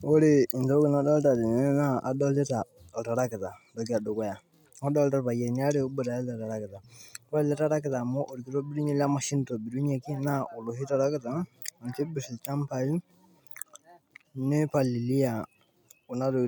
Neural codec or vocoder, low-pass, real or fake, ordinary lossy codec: none; 19.8 kHz; real; none